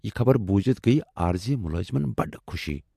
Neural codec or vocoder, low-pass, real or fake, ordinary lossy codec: none; 14.4 kHz; real; MP3, 64 kbps